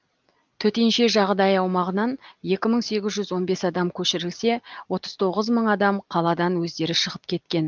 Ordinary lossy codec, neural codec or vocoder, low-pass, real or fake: Opus, 24 kbps; none; 7.2 kHz; real